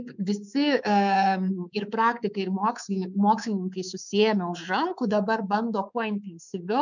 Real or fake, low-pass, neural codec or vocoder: fake; 7.2 kHz; codec, 24 kHz, 3.1 kbps, DualCodec